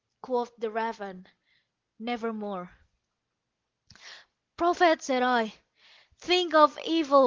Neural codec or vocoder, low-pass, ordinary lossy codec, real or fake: none; 7.2 kHz; Opus, 16 kbps; real